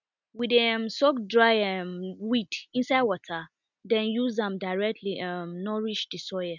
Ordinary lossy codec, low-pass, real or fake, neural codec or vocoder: none; 7.2 kHz; real; none